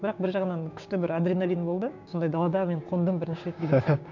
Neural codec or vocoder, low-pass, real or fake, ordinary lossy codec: codec, 16 kHz, 6 kbps, DAC; 7.2 kHz; fake; none